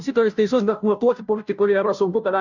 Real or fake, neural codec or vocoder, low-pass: fake; codec, 16 kHz, 0.5 kbps, FunCodec, trained on Chinese and English, 25 frames a second; 7.2 kHz